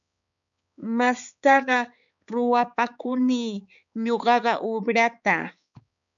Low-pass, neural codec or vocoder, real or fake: 7.2 kHz; codec, 16 kHz, 4 kbps, X-Codec, HuBERT features, trained on balanced general audio; fake